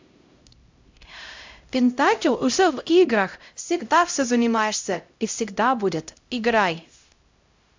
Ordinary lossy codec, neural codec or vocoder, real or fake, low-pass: MP3, 64 kbps; codec, 16 kHz, 0.5 kbps, X-Codec, HuBERT features, trained on LibriSpeech; fake; 7.2 kHz